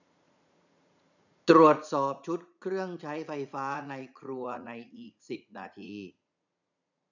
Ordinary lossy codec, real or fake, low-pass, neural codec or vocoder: none; fake; 7.2 kHz; vocoder, 44.1 kHz, 80 mel bands, Vocos